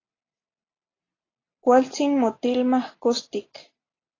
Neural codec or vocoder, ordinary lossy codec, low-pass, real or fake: none; AAC, 32 kbps; 7.2 kHz; real